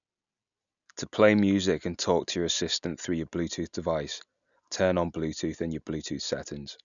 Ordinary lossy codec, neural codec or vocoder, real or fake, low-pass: none; none; real; 7.2 kHz